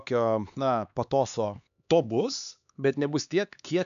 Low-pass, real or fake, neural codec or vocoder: 7.2 kHz; fake; codec, 16 kHz, 4 kbps, X-Codec, HuBERT features, trained on LibriSpeech